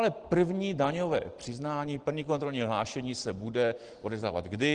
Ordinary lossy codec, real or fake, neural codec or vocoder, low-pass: Opus, 16 kbps; real; none; 9.9 kHz